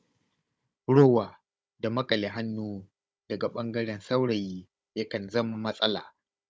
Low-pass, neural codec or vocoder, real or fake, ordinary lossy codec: none; codec, 16 kHz, 16 kbps, FunCodec, trained on Chinese and English, 50 frames a second; fake; none